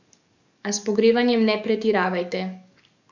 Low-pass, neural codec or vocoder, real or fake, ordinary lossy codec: 7.2 kHz; codec, 44.1 kHz, 7.8 kbps, DAC; fake; none